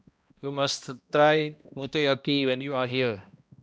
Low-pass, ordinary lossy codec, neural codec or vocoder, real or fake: none; none; codec, 16 kHz, 1 kbps, X-Codec, HuBERT features, trained on balanced general audio; fake